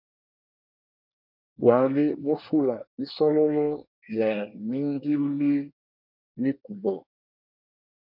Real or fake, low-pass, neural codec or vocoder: fake; 5.4 kHz; codec, 24 kHz, 1 kbps, SNAC